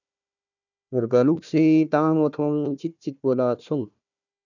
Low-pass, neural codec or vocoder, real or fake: 7.2 kHz; codec, 16 kHz, 1 kbps, FunCodec, trained on Chinese and English, 50 frames a second; fake